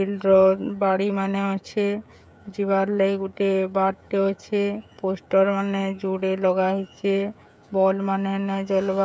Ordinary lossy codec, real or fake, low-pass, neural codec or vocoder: none; fake; none; codec, 16 kHz, 16 kbps, FreqCodec, smaller model